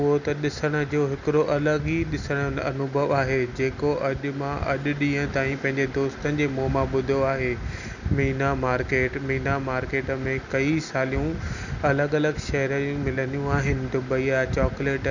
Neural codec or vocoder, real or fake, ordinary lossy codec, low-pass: none; real; none; 7.2 kHz